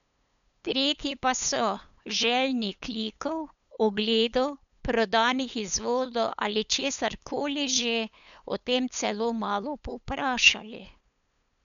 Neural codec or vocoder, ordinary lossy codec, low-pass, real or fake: codec, 16 kHz, 8 kbps, FunCodec, trained on LibriTTS, 25 frames a second; none; 7.2 kHz; fake